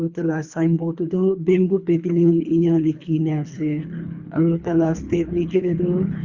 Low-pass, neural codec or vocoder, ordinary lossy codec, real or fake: 7.2 kHz; codec, 24 kHz, 3 kbps, HILCodec; Opus, 64 kbps; fake